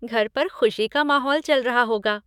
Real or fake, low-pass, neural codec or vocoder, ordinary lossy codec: fake; 19.8 kHz; autoencoder, 48 kHz, 128 numbers a frame, DAC-VAE, trained on Japanese speech; none